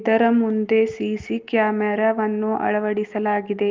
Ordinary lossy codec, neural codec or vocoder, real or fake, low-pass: Opus, 32 kbps; none; real; 7.2 kHz